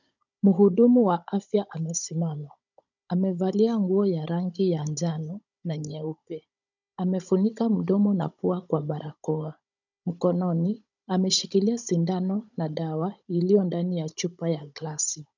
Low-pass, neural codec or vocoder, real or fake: 7.2 kHz; codec, 16 kHz, 16 kbps, FunCodec, trained on Chinese and English, 50 frames a second; fake